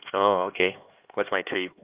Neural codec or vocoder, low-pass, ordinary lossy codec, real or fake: codec, 16 kHz, 2 kbps, X-Codec, HuBERT features, trained on LibriSpeech; 3.6 kHz; Opus, 32 kbps; fake